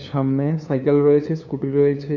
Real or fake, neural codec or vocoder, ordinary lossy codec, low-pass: fake; codec, 16 kHz, 2 kbps, FunCodec, trained on LibriTTS, 25 frames a second; none; 7.2 kHz